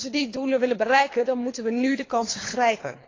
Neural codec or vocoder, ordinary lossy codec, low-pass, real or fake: codec, 24 kHz, 3 kbps, HILCodec; AAC, 32 kbps; 7.2 kHz; fake